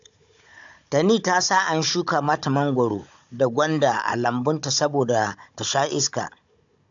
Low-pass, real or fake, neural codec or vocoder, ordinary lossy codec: 7.2 kHz; fake; codec, 16 kHz, 16 kbps, FunCodec, trained on Chinese and English, 50 frames a second; AAC, 64 kbps